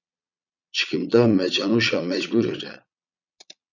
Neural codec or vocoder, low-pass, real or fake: vocoder, 22.05 kHz, 80 mel bands, Vocos; 7.2 kHz; fake